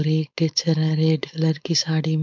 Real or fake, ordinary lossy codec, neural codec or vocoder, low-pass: fake; MP3, 64 kbps; codec, 16 kHz, 4.8 kbps, FACodec; 7.2 kHz